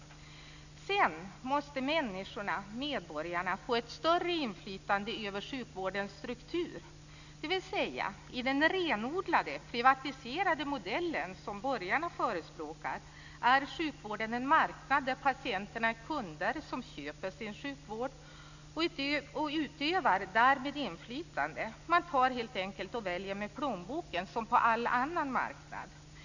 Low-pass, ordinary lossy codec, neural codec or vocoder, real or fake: 7.2 kHz; none; none; real